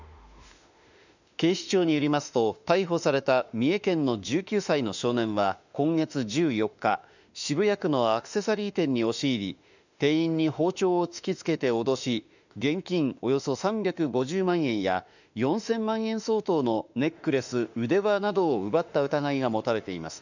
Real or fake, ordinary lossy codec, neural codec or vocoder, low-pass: fake; none; autoencoder, 48 kHz, 32 numbers a frame, DAC-VAE, trained on Japanese speech; 7.2 kHz